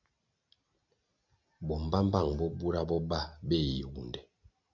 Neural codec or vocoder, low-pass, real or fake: none; 7.2 kHz; real